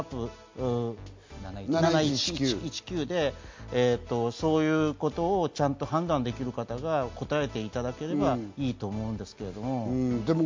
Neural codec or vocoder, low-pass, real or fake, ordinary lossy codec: none; 7.2 kHz; real; MP3, 48 kbps